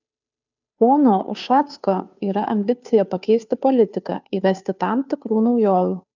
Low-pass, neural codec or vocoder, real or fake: 7.2 kHz; codec, 16 kHz, 2 kbps, FunCodec, trained on Chinese and English, 25 frames a second; fake